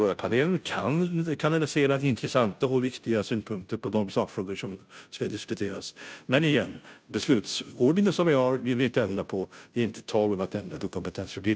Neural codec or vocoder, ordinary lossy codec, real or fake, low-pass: codec, 16 kHz, 0.5 kbps, FunCodec, trained on Chinese and English, 25 frames a second; none; fake; none